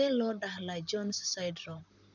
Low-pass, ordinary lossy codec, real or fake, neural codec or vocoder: 7.2 kHz; none; fake; vocoder, 44.1 kHz, 128 mel bands, Pupu-Vocoder